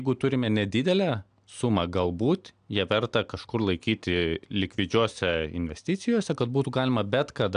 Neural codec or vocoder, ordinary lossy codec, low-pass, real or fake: none; AAC, 96 kbps; 9.9 kHz; real